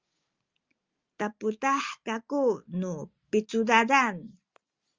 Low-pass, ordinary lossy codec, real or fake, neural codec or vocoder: 7.2 kHz; Opus, 32 kbps; real; none